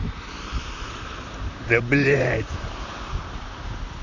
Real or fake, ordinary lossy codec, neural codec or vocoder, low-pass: fake; none; vocoder, 44.1 kHz, 128 mel bands, Pupu-Vocoder; 7.2 kHz